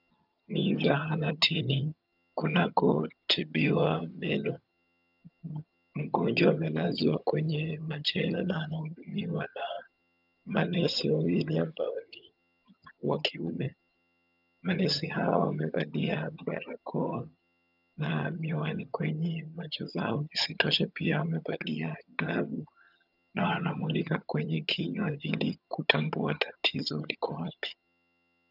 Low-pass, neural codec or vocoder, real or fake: 5.4 kHz; vocoder, 22.05 kHz, 80 mel bands, HiFi-GAN; fake